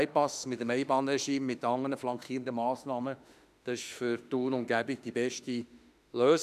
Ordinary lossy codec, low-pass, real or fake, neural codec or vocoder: none; 14.4 kHz; fake; autoencoder, 48 kHz, 32 numbers a frame, DAC-VAE, trained on Japanese speech